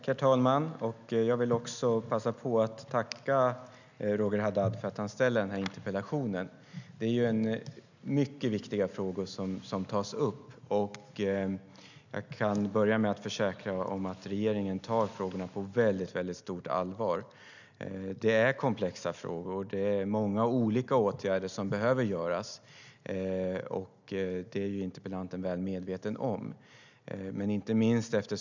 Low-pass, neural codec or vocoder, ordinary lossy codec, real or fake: 7.2 kHz; none; none; real